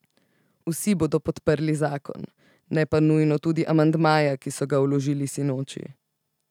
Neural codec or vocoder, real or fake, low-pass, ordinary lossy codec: none; real; 19.8 kHz; none